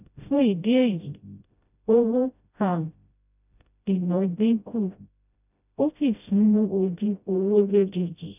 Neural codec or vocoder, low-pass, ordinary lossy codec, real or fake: codec, 16 kHz, 0.5 kbps, FreqCodec, smaller model; 3.6 kHz; none; fake